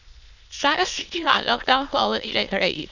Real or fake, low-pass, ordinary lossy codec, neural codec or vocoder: fake; 7.2 kHz; none; autoencoder, 22.05 kHz, a latent of 192 numbers a frame, VITS, trained on many speakers